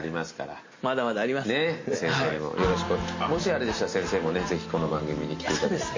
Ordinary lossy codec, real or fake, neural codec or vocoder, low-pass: MP3, 32 kbps; real; none; 7.2 kHz